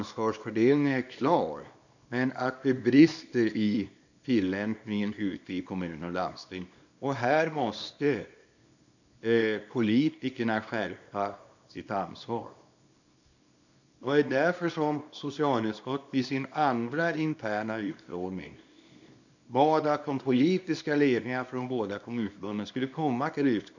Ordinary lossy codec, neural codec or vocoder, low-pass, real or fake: none; codec, 24 kHz, 0.9 kbps, WavTokenizer, small release; 7.2 kHz; fake